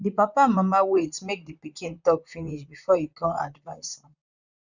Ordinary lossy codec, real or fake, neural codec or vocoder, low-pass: none; fake; vocoder, 44.1 kHz, 128 mel bands, Pupu-Vocoder; 7.2 kHz